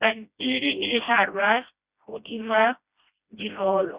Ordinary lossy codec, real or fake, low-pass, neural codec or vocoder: Opus, 64 kbps; fake; 3.6 kHz; codec, 16 kHz, 1 kbps, FreqCodec, smaller model